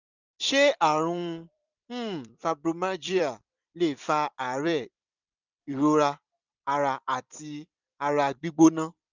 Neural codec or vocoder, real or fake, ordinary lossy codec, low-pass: vocoder, 44.1 kHz, 128 mel bands every 256 samples, BigVGAN v2; fake; none; 7.2 kHz